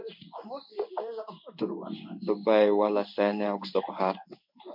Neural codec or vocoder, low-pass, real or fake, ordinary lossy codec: codec, 16 kHz in and 24 kHz out, 1 kbps, XY-Tokenizer; 5.4 kHz; fake; MP3, 48 kbps